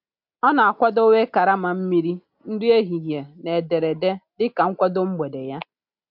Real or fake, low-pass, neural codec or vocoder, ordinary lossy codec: real; 5.4 kHz; none; MP3, 48 kbps